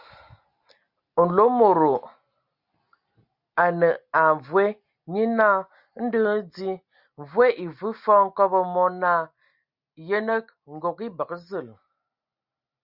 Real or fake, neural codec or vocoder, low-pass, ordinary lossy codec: real; none; 5.4 kHz; Opus, 64 kbps